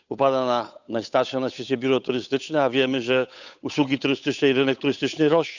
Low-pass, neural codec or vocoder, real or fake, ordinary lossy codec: 7.2 kHz; codec, 16 kHz, 8 kbps, FunCodec, trained on Chinese and English, 25 frames a second; fake; none